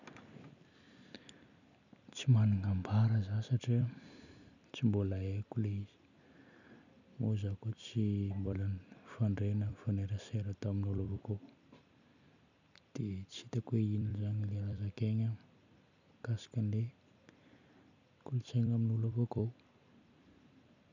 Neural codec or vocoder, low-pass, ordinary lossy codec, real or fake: vocoder, 44.1 kHz, 128 mel bands every 256 samples, BigVGAN v2; 7.2 kHz; none; fake